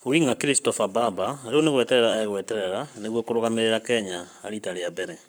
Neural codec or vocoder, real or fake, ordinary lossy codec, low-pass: codec, 44.1 kHz, 7.8 kbps, Pupu-Codec; fake; none; none